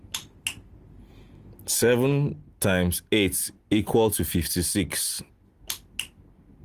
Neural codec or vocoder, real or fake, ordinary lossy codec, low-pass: none; real; Opus, 24 kbps; 14.4 kHz